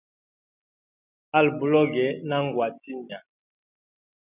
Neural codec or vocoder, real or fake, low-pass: none; real; 3.6 kHz